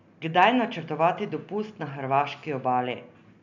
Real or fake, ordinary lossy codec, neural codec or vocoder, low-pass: real; none; none; 7.2 kHz